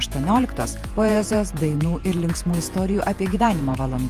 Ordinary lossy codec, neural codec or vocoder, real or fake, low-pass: Opus, 32 kbps; vocoder, 48 kHz, 128 mel bands, Vocos; fake; 14.4 kHz